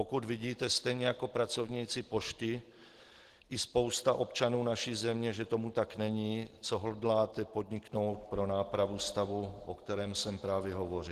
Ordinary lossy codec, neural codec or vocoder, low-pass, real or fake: Opus, 16 kbps; none; 14.4 kHz; real